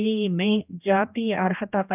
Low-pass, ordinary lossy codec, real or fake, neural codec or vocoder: 3.6 kHz; none; fake; codec, 16 kHz, 1 kbps, X-Codec, HuBERT features, trained on general audio